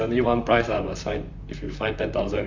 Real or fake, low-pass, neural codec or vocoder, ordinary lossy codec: fake; 7.2 kHz; vocoder, 44.1 kHz, 128 mel bands, Pupu-Vocoder; MP3, 48 kbps